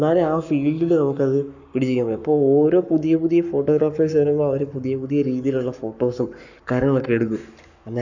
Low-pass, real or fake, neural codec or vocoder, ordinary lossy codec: 7.2 kHz; fake; codec, 44.1 kHz, 7.8 kbps, Pupu-Codec; none